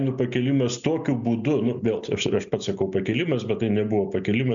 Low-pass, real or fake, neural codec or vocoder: 7.2 kHz; real; none